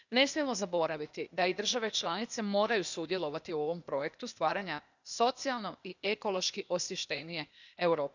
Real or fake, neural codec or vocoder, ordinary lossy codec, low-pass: fake; codec, 16 kHz, 0.8 kbps, ZipCodec; none; 7.2 kHz